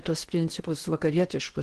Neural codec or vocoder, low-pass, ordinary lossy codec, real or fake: codec, 16 kHz in and 24 kHz out, 0.8 kbps, FocalCodec, streaming, 65536 codes; 10.8 kHz; Opus, 16 kbps; fake